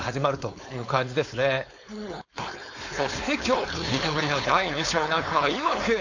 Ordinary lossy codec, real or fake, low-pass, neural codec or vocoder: AAC, 48 kbps; fake; 7.2 kHz; codec, 16 kHz, 4.8 kbps, FACodec